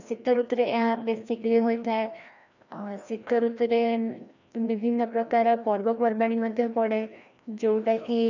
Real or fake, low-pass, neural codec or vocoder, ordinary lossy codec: fake; 7.2 kHz; codec, 16 kHz, 1 kbps, FreqCodec, larger model; none